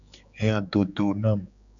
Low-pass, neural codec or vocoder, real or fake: 7.2 kHz; codec, 16 kHz, 4 kbps, X-Codec, HuBERT features, trained on general audio; fake